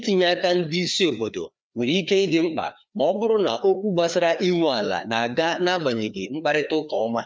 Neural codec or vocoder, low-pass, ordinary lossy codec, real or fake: codec, 16 kHz, 2 kbps, FreqCodec, larger model; none; none; fake